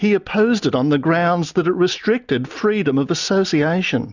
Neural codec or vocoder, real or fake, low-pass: none; real; 7.2 kHz